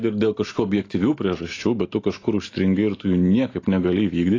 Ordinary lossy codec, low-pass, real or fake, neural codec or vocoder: AAC, 32 kbps; 7.2 kHz; real; none